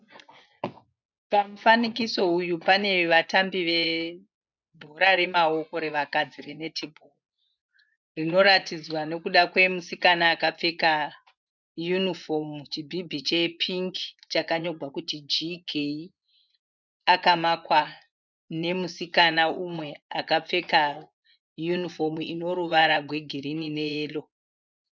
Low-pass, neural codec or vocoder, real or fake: 7.2 kHz; vocoder, 24 kHz, 100 mel bands, Vocos; fake